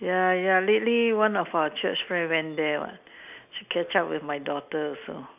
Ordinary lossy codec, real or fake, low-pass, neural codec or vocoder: none; real; 3.6 kHz; none